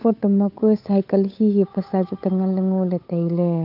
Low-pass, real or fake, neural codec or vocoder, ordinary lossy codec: 5.4 kHz; fake; codec, 16 kHz, 8 kbps, FunCodec, trained on Chinese and English, 25 frames a second; AAC, 48 kbps